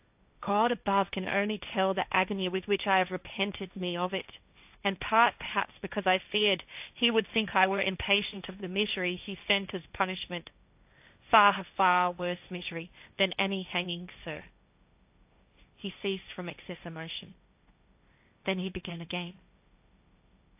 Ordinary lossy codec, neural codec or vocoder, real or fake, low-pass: AAC, 32 kbps; codec, 16 kHz, 1.1 kbps, Voila-Tokenizer; fake; 3.6 kHz